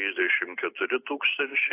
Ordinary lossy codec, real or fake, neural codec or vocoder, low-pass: AAC, 24 kbps; real; none; 3.6 kHz